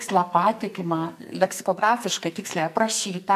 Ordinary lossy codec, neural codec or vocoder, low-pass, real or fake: AAC, 96 kbps; codec, 44.1 kHz, 2.6 kbps, SNAC; 14.4 kHz; fake